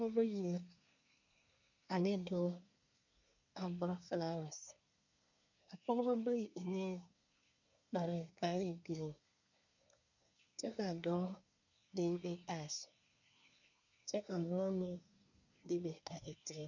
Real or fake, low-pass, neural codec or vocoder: fake; 7.2 kHz; codec, 24 kHz, 1 kbps, SNAC